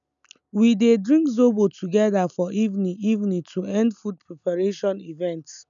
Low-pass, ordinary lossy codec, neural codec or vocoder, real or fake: 7.2 kHz; none; none; real